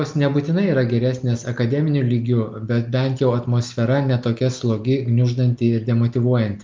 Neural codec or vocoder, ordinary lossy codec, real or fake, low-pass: none; Opus, 32 kbps; real; 7.2 kHz